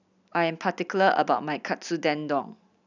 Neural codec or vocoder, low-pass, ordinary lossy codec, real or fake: none; 7.2 kHz; none; real